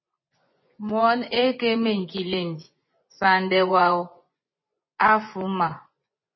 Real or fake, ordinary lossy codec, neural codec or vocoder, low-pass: fake; MP3, 24 kbps; vocoder, 24 kHz, 100 mel bands, Vocos; 7.2 kHz